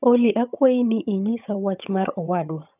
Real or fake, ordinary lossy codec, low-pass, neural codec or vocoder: fake; none; 3.6 kHz; vocoder, 22.05 kHz, 80 mel bands, HiFi-GAN